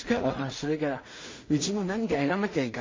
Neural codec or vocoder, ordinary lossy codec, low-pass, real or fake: codec, 16 kHz in and 24 kHz out, 0.4 kbps, LongCat-Audio-Codec, two codebook decoder; MP3, 32 kbps; 7.2 kHz; fake